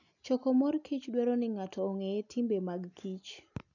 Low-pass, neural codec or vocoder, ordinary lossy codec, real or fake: 7.2 kHz; none; none; real